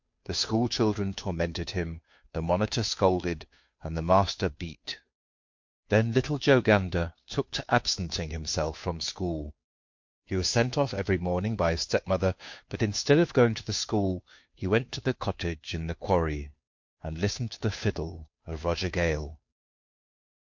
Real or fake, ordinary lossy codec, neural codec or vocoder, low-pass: fake; MP3, 48 kbps; codec, 16 kHz, 2 kbps, FunCodec, trained on Chinese and English, 25 frames a second; 7.2 kHz